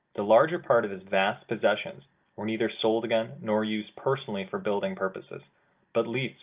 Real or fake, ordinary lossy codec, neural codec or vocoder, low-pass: real; Opus, 24 kbps; none; 3.6 kHz